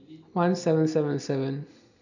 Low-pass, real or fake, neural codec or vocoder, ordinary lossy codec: 7.2 kHz; real; none; none